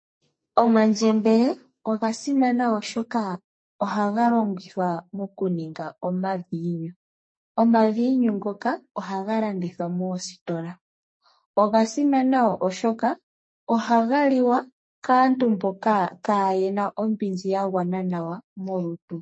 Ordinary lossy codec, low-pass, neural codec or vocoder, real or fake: MP3, 32 kbps; 9.9 kHz; codec, 44.1 kHz, 2.6 kbps, SNAC; fake